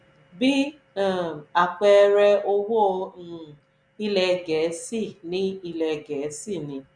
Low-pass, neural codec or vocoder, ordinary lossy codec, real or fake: 9.9 kHz; none; none; real